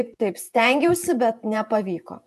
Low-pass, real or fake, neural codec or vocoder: 14.4 kHz; fake; vocoder, 48 kHz, 128 mel bands, Vocos